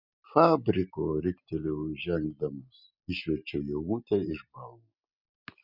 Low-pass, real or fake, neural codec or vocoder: 5.4 kHz; real; none